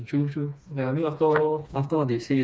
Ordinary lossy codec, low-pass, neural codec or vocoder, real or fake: none; none; codec, 16 kHz, 2 kbps, FreqCodec, smaller model; fake